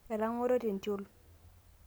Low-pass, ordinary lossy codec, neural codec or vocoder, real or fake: none; none; none; real